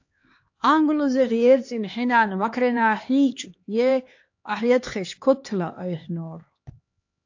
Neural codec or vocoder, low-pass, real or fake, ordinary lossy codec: codec, 16 kHz, 2 kbps, X-Codec, HuBERT features, trained on LibriSpeech; 7.2 kHz; fake; AAC, 48 kbps